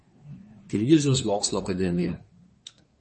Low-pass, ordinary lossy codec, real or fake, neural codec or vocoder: 10.8 kHz; MP3, 32 kbps; fake; codec, 24 kHz, 1 kbps, SNAC